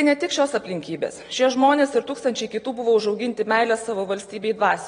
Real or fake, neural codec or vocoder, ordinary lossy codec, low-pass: real; none; AAC, 32 kbps; 9.9 kHz